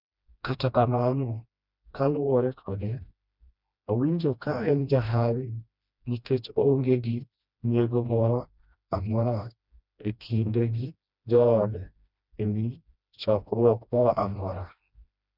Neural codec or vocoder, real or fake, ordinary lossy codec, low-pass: codec, 16 kHz, 1 kbps, FreqCodec, smaller model; fake; none; 5.4 kHz